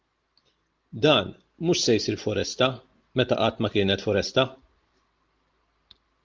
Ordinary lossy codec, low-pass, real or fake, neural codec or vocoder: Opus, 32 kbps; 7.2 kHz; real; none